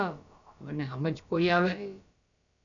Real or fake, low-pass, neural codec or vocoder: fake; 7.2 kHz; codec, 16 kHz, about 1 kbps, DyCAST, with the encoder's durations